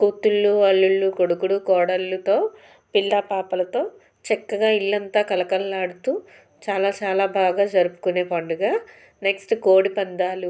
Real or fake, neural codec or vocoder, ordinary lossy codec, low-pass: real; none; none; none